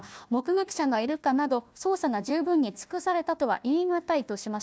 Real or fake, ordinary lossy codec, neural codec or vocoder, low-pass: fake; none; codec, 16 kHz, 1 kbps, FunCodec, trained on Chinese and English, 50 frames a second; none